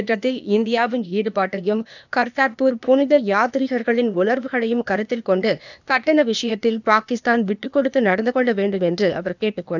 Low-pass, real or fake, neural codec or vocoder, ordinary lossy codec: 7.2 kHz; fake; codec, 16 kHz, 0.8 kbps, ZipCodec; none